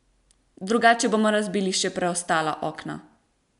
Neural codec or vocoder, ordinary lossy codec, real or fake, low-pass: none; none; real; 10.8 kHz